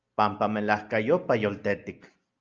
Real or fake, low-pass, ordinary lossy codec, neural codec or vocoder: real; 7.2 kHz; Opus, 24 kbps; none